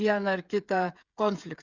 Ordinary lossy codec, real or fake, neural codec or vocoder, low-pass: Opus, 64 kbps; fake; codec, 16 kHz, 16 kbps, FreqCodec, smaller model; 7.2 kHz